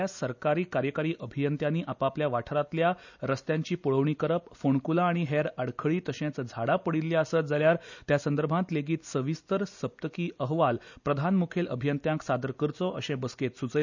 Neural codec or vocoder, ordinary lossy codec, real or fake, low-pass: none; none; real; 7.2 kHz